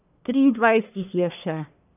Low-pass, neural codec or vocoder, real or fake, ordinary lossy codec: 3.6 kHz; codec, 44.1 kHz, 1.7 kbps, Pupu-Codec; fake; none